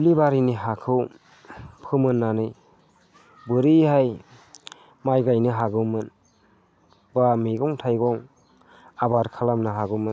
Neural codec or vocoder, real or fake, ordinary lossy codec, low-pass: none; real; none; none